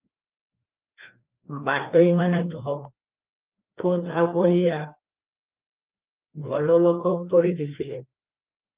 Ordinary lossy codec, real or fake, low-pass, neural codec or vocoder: Opus, 32 kbps; fake; 3.6 kHz; codec, 16 kHz, 2 kbps, FreqCodec, larger model